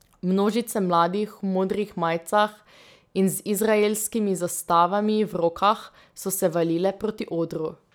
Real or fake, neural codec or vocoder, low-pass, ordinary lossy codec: real; none; none; none